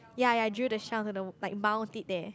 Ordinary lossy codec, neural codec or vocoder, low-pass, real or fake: none; none; none; real